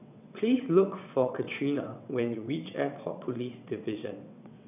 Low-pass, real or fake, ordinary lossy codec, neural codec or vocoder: 3.6 kHz; fake; none; vocoder, 22.05 kHz, 80 mel bands, WaveNeXt